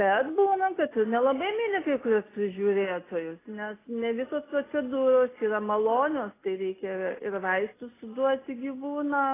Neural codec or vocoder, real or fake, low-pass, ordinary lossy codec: none; real; 3.6 kHz; AAC, 16 kbps